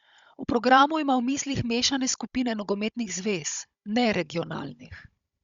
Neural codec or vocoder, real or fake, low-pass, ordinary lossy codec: codec, 16 kHz, 16 kbps, FunCodec, trained on Chinese and English, 50 frames a second; fake; 7.2 kHz; Opus, 64 kbps